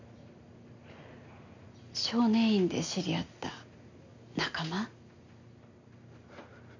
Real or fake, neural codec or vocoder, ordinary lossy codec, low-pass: real; none; none; 7.2 kHz